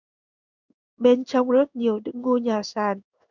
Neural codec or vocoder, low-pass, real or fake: codec, 16 kHz in and 24 kHz out, 1 kbps, XY-Tokenizer; 7.2 kHz; fake